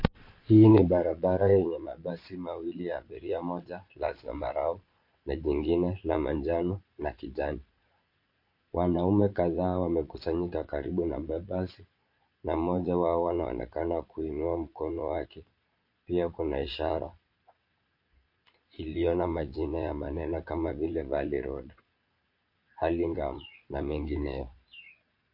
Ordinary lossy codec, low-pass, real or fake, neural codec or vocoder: MP3, 32 kbps; 5.4 kHz; fake; vocoder, 22.05 kHz, 80 mel bands, Vocos